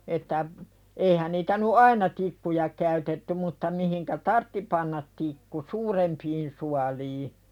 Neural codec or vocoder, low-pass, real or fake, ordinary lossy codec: none; 19.8 kHz; real; none